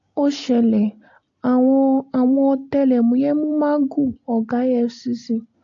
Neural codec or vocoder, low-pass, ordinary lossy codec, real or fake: none; 7.2 kHz; AAC, 48 kbps; real